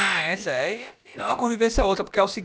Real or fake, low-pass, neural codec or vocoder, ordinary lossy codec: fake; none; codec, 16 kHz, about 1 kbps, DyCAST, with the encoder's durations; none